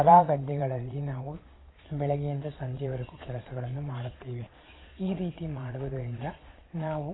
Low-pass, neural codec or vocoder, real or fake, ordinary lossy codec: 7.2 kHz; vocoder, 22.05 kHz, 80 mel bands, Vocos; fake; AAC, 16 kbps